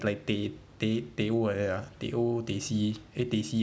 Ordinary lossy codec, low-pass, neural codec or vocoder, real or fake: none; none; none; real